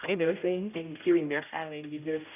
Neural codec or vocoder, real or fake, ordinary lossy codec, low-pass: codec, 16 kHz, 0.5 kbps, X-Codec, HuBERT features, trained on general audio; fake; none; 3.6 kHz